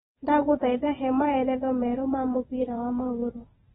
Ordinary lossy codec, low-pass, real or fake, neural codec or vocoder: AAC, 16 kbps; 9.9 kHz; fake; vocoder, 22.05 kHz, 80 mel bands, WaveNeXt